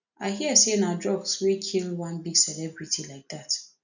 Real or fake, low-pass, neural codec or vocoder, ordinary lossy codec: real; 7.2 kHz; none; none